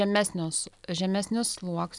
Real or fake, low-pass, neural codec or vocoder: real; 10.8 kHz; none